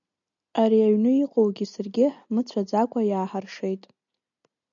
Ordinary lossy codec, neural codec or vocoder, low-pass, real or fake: MP3, 96 kbps; none; 7.2 kHz; real